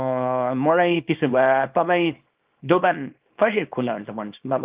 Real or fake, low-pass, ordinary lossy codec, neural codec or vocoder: fake; 3.6 kHz; Opus, 32 kbps; codec, 24 kHz, 0.9 kbps, WavTokenizer, small release